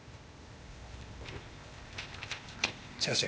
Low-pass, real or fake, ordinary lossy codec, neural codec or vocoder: none; fake; none; codec, 16 kHz, 0.8 kbps, ZipCodec